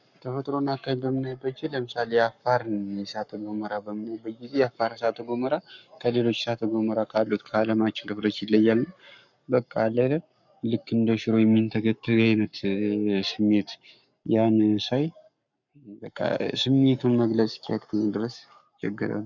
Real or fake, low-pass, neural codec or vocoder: fake; 7.2 kHz; codec, 44.1 kHz, 7.8 kbps, Pupu-Codec